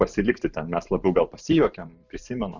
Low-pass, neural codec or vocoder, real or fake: 7.2 kHz; vocoder, 44.1 kHz, 128 mel bands every 512 samples, BigVGAN v2; fake